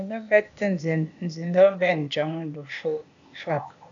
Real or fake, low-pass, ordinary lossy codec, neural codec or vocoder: fake; 7.2 kHz; AAC, 64 kbps; codec, 16 kHz, 0.8 kbps, ZipCodec